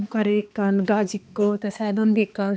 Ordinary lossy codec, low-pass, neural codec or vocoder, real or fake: none; none; codec, 16 kHz, 2 kbps, X-Codec, HuBERT features, trained on balanced general audio; fake